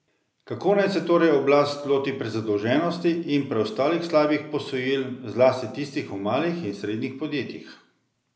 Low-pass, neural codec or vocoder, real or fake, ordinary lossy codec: none; none; real; none